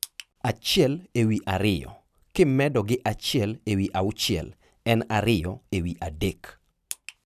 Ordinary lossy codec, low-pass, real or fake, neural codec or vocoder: none; 14.4 kHz; real; none